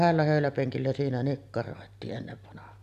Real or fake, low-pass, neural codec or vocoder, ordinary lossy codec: real; 14.4 kHz; none; none